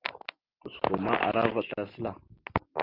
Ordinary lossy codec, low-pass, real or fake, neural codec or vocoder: Opus, 24 kbps; 5.4 kHz; real; none